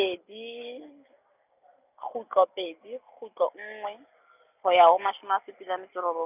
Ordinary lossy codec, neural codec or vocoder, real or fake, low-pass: none; none; real; 3.6 kHz